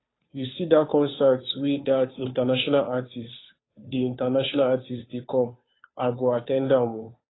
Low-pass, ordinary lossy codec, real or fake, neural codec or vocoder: 7.2 kHz; AAC, 16 kbps; fake; codec, 16 kHz, 2 kbps, FunCodec, trained on Chinese and English, 25 frames a second